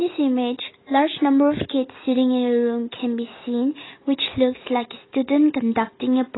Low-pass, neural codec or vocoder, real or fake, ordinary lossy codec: 7.2 kHz; none; real; AAC, 16 kbps